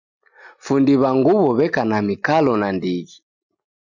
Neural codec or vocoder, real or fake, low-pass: none; real; 7.2 kHz